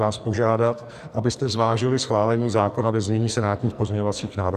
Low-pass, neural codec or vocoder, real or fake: 14.4 kHz; codec, 44.1 kHz, 2.6 kbps, SNAC; fake